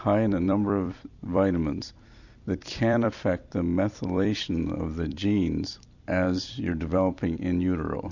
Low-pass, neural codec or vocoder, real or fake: 7.2 kHz; vocoder, 44.1 kHz, 128 mel bands every 256 samples, BigVGAN v2; fake